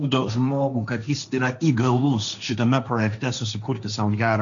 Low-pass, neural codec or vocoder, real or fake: 7.2 kHz; codec, 16 kHz, 1.1 kbps, Voila-Tokenizer; fake